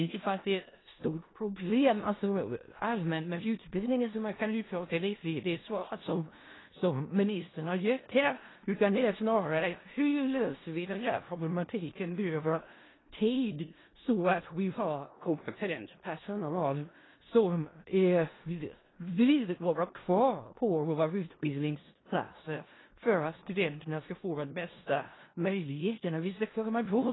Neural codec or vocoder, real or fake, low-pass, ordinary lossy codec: codec, 16 kHz in and 24 kHz out, 0.4 kbps, LongCat-Audio-Codec, four codebook decoder; fake; 7.2 kHz; AAC, 16 kbps